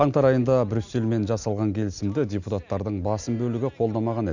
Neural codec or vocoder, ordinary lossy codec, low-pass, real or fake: none; none; 7.2 kHz; real